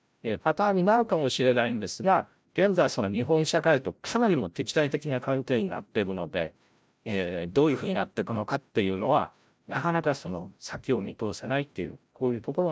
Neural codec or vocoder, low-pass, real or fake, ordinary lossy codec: codec, 16 kHz, 0.5 kbps, FreqCodec, larger model; none; fake; none